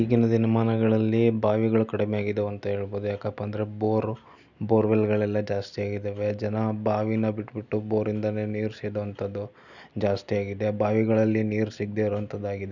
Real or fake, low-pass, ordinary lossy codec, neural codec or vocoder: real; 7.2 kHz; none; none